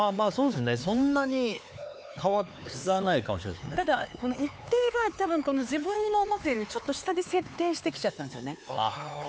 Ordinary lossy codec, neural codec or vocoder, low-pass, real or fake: none; codec, 16 kHz, 4 kbps, X-Codec, HuBERT features, trained on LibriSpeech; none; fake